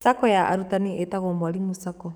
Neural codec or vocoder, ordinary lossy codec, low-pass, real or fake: codec, 44.1 kHz, 7.8 kbps, DAC; none; none; fake